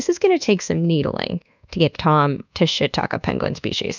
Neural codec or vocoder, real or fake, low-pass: codec, 24 kHz, 1.2 kbps, DualCodec; fake; 7.2 kHz